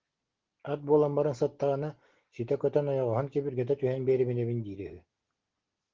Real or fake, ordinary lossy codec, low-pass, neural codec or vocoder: real; Opus, 16 kbps; 7.2 kHz; none